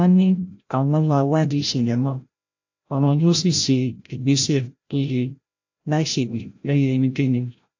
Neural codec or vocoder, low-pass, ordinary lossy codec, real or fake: codec, 16 kHz, 0.5 kbps, FreqCodec, larger model; 7.2 kHz; AAC, 48 kbps; fake